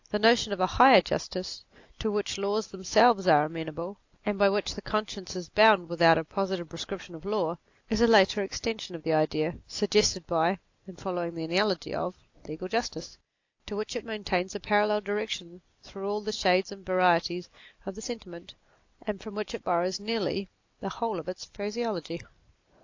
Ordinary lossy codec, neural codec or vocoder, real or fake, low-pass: AAC, 48 kbps; none; real; 7.2 kHz